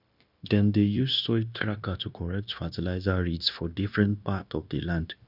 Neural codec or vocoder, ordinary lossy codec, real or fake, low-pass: codec, 16 kHz, 0.9 kbps, LongCat-Audio-Codec; none; fake; 5.4 kHz